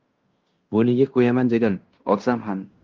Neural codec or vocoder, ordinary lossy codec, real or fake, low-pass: codec, 16 kHz in and 24 kHz out, 0.9 kbps, LongCat-Audio-Codec, fine tuned four codebook decoder; Opus, 32 kbps; fake; 7.2 kHz